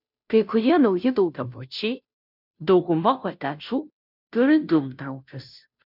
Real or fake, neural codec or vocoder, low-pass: fake; codec, 16 kHz, 0.5 kbps, FunCodec, trained on Chinese and English, 25 frames a second; 5.4 kHz